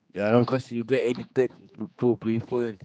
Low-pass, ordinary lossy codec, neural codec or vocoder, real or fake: none; none; codec, 16 kHz, 2 kbps, X-Codec, HuBERT features, trained on general audio; fake